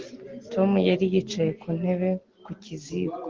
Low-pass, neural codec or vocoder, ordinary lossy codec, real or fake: 7.2 kHz; none; Opus, 16 kbps; real